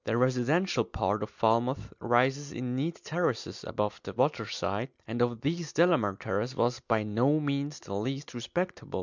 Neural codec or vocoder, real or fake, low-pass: none; real; 7.2 kHz